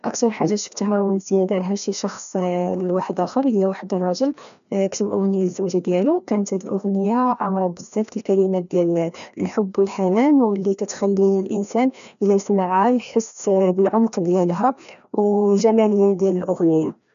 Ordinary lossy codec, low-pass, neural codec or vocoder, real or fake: none; 7.2 kHz; codec, 16 kHz, 1 kbps, FreqCodec, larger model; fake